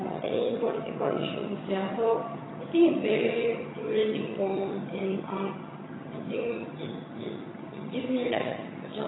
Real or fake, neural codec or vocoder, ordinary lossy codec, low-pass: fake; vocoder, 22.05 kHz, 80 mel bands, HiFi-GAN; AAC, 16 kbps; 7.2 kHz